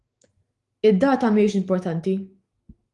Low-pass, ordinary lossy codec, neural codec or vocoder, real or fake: 10.8 kHz; Opus, 24 kbps; autoencoder, 48 kHz, 128 numbers a frame, DAC-VAE, trained on Japanese speech; fake